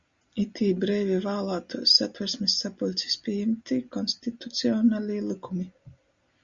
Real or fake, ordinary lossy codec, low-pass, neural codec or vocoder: real; Opus, 64 kbps; 7.2 kHz; none